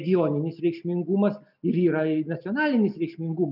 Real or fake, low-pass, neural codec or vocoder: real; 5.4 kHz; none